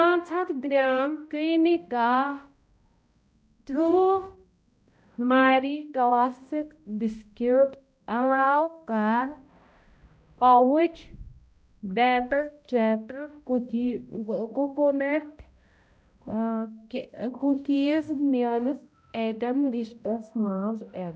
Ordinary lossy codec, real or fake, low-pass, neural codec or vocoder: none; fake; none; codec, 16 kHz, 0.5 kbps, X-Codec, HuBERT features, trained on balanced general audio